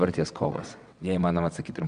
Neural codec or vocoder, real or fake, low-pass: vocoder, 22.05 kHz, 80 mel bands, WaveNeXt; fake; 9.9 kHz